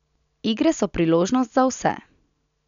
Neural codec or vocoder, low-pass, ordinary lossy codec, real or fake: none; 7.2 kHz; none; real